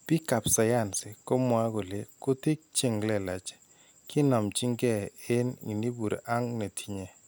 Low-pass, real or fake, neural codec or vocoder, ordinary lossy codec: none; real; none; none